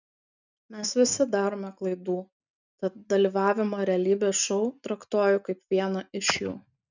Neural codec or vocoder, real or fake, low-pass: none; real; 7.2 kHz